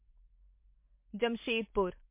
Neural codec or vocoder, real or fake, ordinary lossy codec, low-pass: none; real; MP3, 24 kbps; 3.6 kHz